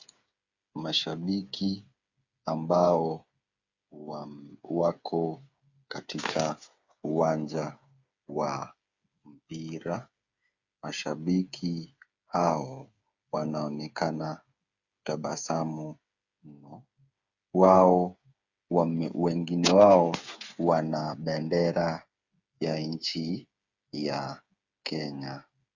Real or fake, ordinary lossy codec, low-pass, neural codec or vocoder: fake; Opus, 64 kbps; 7.2 kHz; codec, 16 kHz, 8 kbps, FreqCodec, smaller model